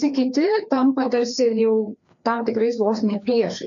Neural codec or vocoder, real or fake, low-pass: codec, 16 kHz, 2 kbps, FreqCodec, larger model; fake; 7.2 kHz